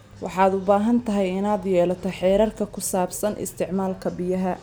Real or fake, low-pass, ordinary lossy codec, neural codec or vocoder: real; none; none; none